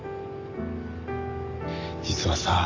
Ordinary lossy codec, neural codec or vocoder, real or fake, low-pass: AAC, 48 kbps; none; real; 7.2 kHz